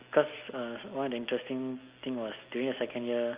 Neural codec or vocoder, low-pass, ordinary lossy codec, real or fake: none; 3.6 kHz; Opus, 32 kbps; real